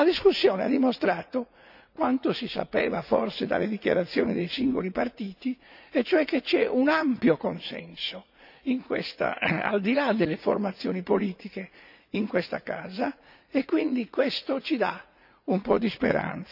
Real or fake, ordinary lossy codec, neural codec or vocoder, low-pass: fake; none; vocoder, 44.1 kHz, 80 mel bands, Vocos; 5.4 kHz